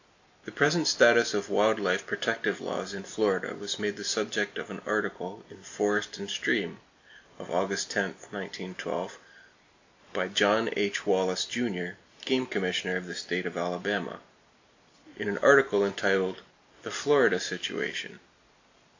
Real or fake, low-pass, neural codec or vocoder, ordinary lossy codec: real; 7.2 kHz; none; AAC, 48 kbps